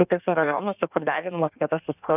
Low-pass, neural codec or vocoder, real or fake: 3.6 kHz; codec, 16 kHz in and 24 kHz out, 1.1 kbps, FireRedTTS-2 codec; fake